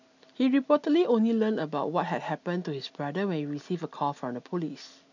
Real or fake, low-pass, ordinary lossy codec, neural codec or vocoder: real; 7.2 kHz; none; none